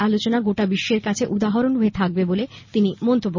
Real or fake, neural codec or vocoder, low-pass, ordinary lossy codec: real; none; 7.2 kHz; MP3, 32 kbps